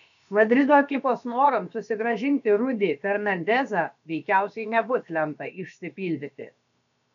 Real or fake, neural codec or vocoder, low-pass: fake; codec, 16 kHz, about 1 kbps, DyCAST, with the encoder's durations; 7.2 kHz